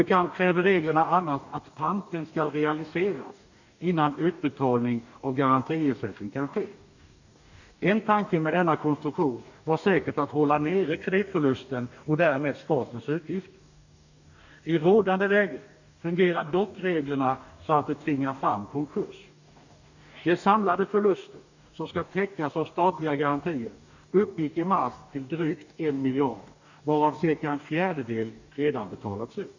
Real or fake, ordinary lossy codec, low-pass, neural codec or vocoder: fake; none; 7.2 kHz; codec, 44.1 kHz, 2.6 kbps, DAC